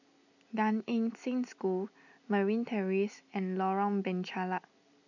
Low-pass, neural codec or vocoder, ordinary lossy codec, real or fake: 7.2 kHz; none; none; real